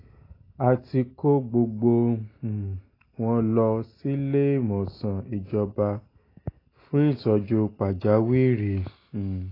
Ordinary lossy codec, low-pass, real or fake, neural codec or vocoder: AAC, 24 kbps; 5.4 kHz; real; none